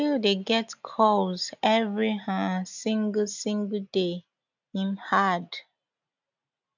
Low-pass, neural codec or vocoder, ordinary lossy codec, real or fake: 7.2 kHz; none; none; real